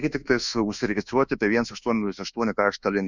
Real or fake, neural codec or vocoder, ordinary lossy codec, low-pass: fake; codec, 24 kHz, 1.2 kbps, DualCodec; Opus, 64 kbps; 7.2 kHz